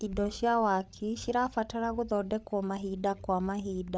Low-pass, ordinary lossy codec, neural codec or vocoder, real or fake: none; none; codec, 16 kHz, 8 kbps, FreqCodec, larger model; fake